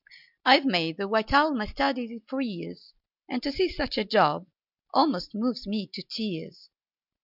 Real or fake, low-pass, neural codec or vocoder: real; 5.4 kHz; none